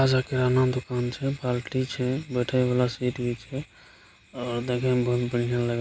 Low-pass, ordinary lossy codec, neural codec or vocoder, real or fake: none; none; none; real